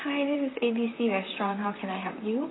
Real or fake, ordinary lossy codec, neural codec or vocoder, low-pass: fake; AAC, 16 kbps; vocoder, 44.1 kHz, 128 mel bands, Pupu-Vocoder; 7.2 kHz